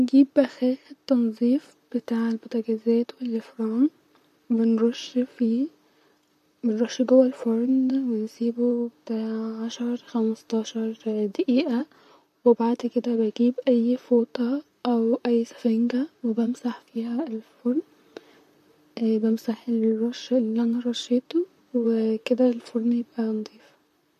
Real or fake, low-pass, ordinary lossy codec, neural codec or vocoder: fake; 14.4 kHz; AAC, 96 kbps; vocoder, 44.1 kHz, 128 mel bands, Pupu-Vocoder